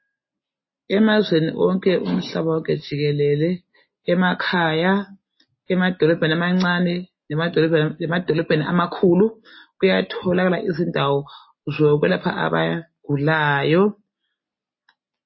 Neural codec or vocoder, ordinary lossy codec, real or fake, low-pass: none; MP3, 24 kbps; real; 7.2 kHz